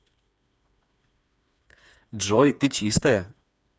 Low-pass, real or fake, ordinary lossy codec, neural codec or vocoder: none; fake; none; codec, 16 kHz, 4 kbps, FreqCodec, smaller model